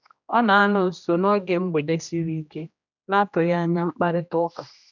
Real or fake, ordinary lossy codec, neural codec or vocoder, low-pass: fake; none; codec, 16 kHz, 1 kbps, X-Codec, HuBERT features, trained on general audio; 7.2 kHz